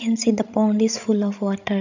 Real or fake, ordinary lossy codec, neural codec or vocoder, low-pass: fake; none; codec, 16 kHz, 16 kbps, FreqCodec, larger model; 7.2 kHz